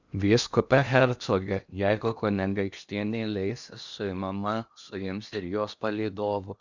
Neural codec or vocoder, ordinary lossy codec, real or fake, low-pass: codec, 16 kHz in and 24 kHz out, 0.8 kbps, FocalCodec, streaming, 65536 codes; Opus, 64 kbps; fake; 7.2 kHz